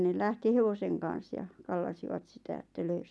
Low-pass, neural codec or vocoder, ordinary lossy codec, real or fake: none; none; none; real